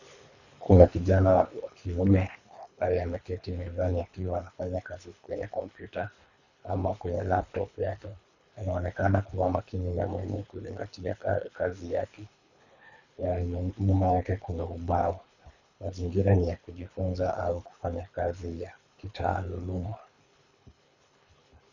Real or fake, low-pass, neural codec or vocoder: fake; 7.2 kHz; codec, 24 kHz, 3 kbps, HILCodec